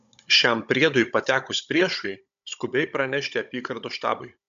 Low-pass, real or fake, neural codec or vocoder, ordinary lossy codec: 7.2 kHz; fake; codec, 16 kHz, 16 kbps, FunCodec, trained on Chinese and English, 50 frames a second; AAC, 96 kbps